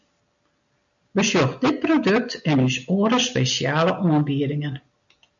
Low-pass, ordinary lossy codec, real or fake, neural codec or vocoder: 7.2 kHz; MP3, 96 kbps; real; none